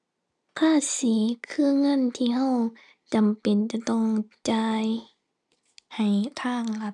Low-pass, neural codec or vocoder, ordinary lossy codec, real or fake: 10.8 kHz; none; none; real